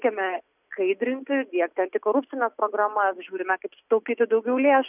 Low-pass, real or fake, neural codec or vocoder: 3.6 kHz; real; none